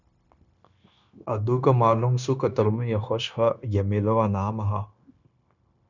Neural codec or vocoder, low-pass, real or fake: codec, 16 kHz, 0.9 kbps, LongCat-Audio-Codec; 7.2 kHz; fake